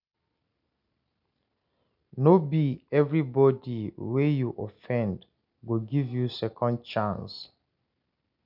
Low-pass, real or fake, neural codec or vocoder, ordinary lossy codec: 5.4 kHz; real; none; none